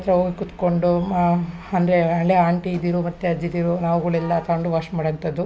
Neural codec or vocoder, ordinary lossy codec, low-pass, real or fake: none; none; none; real